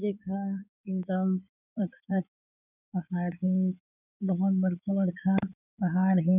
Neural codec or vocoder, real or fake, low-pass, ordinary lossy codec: codec, 16 kHz in and 24 kHz out, 2.2 kbps, FireRedTTS-2 codec; fake; 3.6 kHz; none